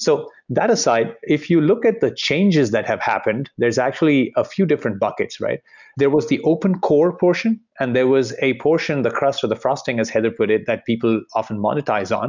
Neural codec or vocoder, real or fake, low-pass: none; real; 7.2 kHz